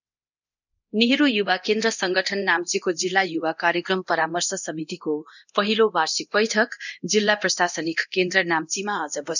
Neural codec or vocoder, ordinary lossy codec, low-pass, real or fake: codec, 24 kHz, 0.9 kbps, DualCodec; none; 7.2 kHz; fake